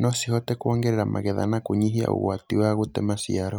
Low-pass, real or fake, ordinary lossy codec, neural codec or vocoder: none; real; none; none